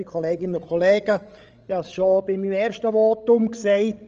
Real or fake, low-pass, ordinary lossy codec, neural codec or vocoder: fake; 7.2 kHz; Opus, 24 kbps; codec, 16 kHz, 16 kbps, FreqCodec, larger model